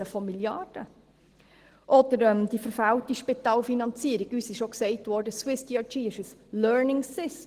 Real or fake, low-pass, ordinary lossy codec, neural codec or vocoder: real; 14.4 kHz; Opus, 16 kbps; none